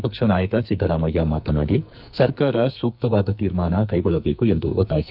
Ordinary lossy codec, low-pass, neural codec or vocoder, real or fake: none; 5.4 kHz; codec, 44.1 kHz, 2.6 kbps, SNAC; fake